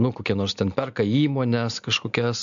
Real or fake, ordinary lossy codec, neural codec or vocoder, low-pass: real; AAC, 96 kbps; none; 7.2 kHz